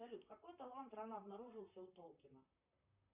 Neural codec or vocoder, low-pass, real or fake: vocoder, 44.1 kHz, 128 mel bands, Pupu-Vocoder; 3.6 kHz; fake